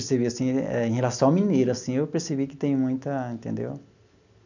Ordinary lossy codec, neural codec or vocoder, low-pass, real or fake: none; none; 7.2 kHz; real